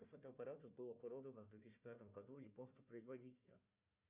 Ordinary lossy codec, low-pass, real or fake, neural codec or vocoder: Opus, 64 kbps; 3.6 kHz; fake; codec, 16 kHz, 1 kbps, FunCodec, trained on Chinese and English, 50 frames a second